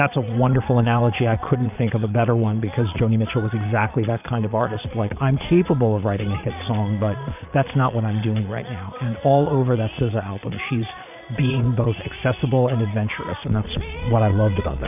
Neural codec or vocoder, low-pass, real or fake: vocoder, 22.05 kHz, 80 mel bands, Vocos; 3.6 kHz; fake